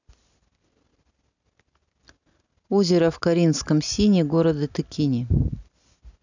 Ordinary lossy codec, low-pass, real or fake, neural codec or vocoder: none; 7.2 kHz; real; none